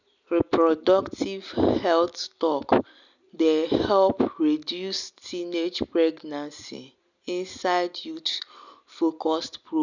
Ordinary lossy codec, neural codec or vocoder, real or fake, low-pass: none; none; real; 7.2 kHz